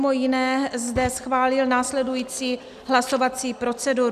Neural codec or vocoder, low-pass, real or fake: none; 14.4 kHz; real